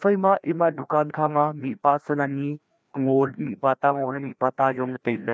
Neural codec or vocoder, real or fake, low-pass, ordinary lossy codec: codec, 16 kHz, 1 kbps, FreqCodec, larger model; fake; none; none